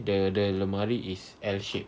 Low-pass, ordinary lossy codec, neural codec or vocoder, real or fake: none; none; none; real